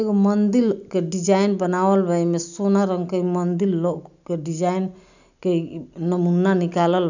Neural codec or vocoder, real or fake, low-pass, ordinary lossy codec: none; real; 7.2 kHz; none